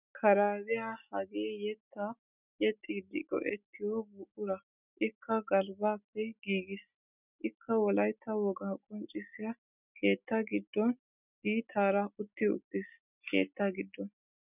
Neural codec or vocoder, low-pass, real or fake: none; 3.6 kHz; real